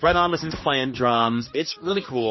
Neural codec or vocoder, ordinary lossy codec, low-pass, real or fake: codec, 16 kHz, 2 kbps, X-Codec, HuBERT features, trained on general audio; MP3, 24 kbps; 7.2 kHz; fake